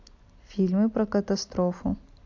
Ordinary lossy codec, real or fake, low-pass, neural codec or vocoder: AAC, 48 kbps; real; 7.2 kHz; none